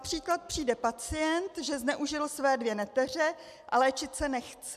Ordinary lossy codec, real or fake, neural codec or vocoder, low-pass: MP3, 96 kbps; real; none; 14.4 kHz